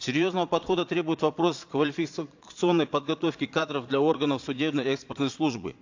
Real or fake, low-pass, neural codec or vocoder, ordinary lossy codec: fake; 7.2 kHz; vocoder, 22.05 kHz, 80 mel bands, Vocos; AAC, 48 kbps